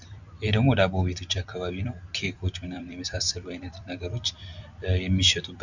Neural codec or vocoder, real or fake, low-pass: vocoder, 44.1 kHz, 128 mel bands every 256 samples, BigVGAN v2; fake; 7.2 kHz